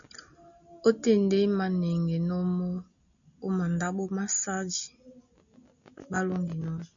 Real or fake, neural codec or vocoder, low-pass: real; none; 7.2 kHz